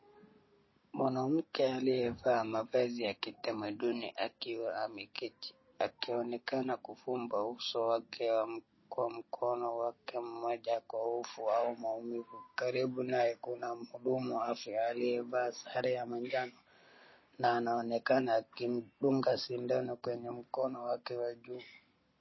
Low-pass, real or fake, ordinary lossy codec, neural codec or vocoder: 7.2 kHz; real; MP3, 24 kbps; none